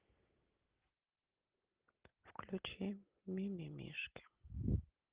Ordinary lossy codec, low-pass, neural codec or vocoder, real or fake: Opus, 16 kbps; 3.6 kHz; none; real